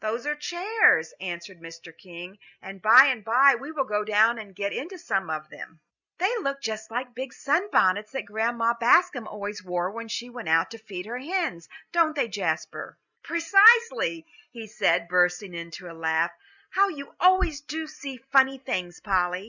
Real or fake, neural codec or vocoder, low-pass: real; none; 7.2 kHz